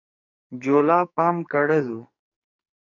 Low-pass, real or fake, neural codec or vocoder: 7.2 kHz; fake; codec, 44.1 kHz, 2.6 kbps, SNAC